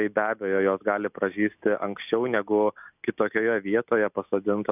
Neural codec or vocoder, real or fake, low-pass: none; real; 3.6 kHz